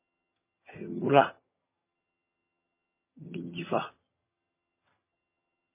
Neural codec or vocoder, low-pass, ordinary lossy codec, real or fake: vocoder, 22.05 kHz, 80 mel bands, HiFi-GAN; 3.6 kHz; MP3, 16 kbps; fake